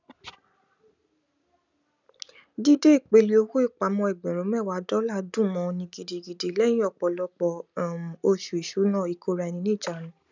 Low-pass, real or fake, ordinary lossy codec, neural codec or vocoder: 7.2 kHz; real; none; none